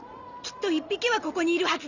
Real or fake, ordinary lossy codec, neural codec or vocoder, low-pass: real; none; none; 7.2 kHz